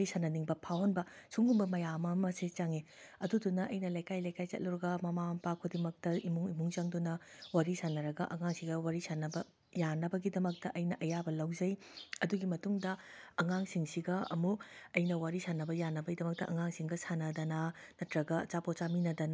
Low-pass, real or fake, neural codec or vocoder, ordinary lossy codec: none; real; none; none